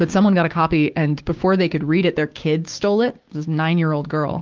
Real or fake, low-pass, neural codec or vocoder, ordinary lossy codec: fake; 7.2 kHz; codec, 16 kHz, 6 kbps, DAC; Opus, 32 kbps